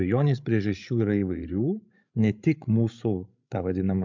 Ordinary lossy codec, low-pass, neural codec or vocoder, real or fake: MP3, 64 kbps; 7.2 kHz; codec, 16 kHz, 16 kbps, FreqCodec, larger model; fake